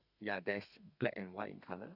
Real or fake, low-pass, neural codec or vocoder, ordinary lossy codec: fake; 5.4 kHz; codec, 32 kHz, 1.9 kbps, SNAC; Opus, 64 kbps